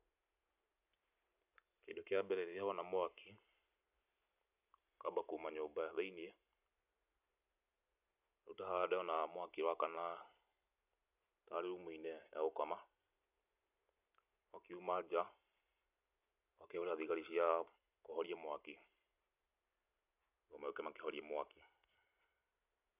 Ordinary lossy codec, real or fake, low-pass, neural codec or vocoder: none; real; 3.6 kHz; none